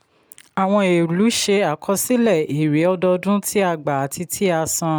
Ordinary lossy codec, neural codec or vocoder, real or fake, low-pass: none; none; real; none